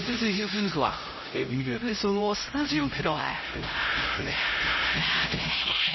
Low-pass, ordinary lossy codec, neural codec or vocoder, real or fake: 7.2 kHz; MP3, 24 kbps; codec, 16 kHz, 0.5 kbps, X-Codec, HuBERT features, trained on LibriSpeech; fake